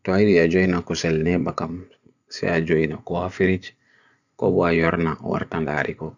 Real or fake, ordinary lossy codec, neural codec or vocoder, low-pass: fake; none; vocoder, 24 kHz, 100 mel bands, Vocos; 7.2 kHz